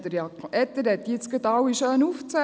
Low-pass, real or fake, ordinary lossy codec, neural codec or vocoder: none; real; none; none